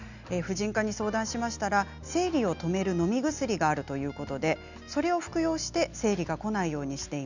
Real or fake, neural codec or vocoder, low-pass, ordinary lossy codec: real; none; 7.2 kHz; none